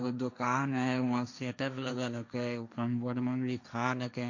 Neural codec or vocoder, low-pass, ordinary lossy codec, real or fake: codec, 16 kHz, 1.1 kbps, Voila-Tokenizer; 7.2 kHz; none; fake